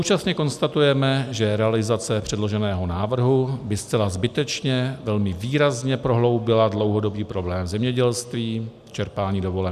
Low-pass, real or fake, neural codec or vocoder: 14.4 kHz; real; none